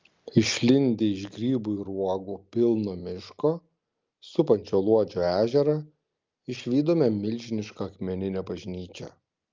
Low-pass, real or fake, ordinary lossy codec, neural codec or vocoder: 7.2 kHz; real; Opus, 24 kbps; none